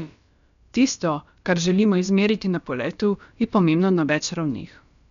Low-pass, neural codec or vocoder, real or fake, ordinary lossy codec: 7.2 kHz; codec, 16 kHz, about 1 kbps, DyCAST, with the encoder's durations; fake; none